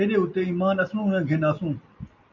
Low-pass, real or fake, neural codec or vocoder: 7.2 kHz; real; none